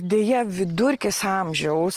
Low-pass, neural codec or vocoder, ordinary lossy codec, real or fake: 14.4 kHz; none; Opus, 24 kbps; real